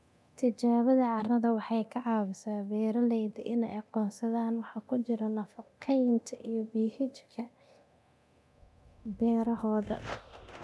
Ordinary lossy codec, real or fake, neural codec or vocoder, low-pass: none; fake; codec, 24 kHz, 0.9 kbps, DualCodec; none